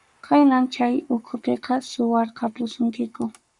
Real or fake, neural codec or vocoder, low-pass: fake; codec, 44.1 kHz, 7.8 kbps, Pupu-Codec; 10.8 kHz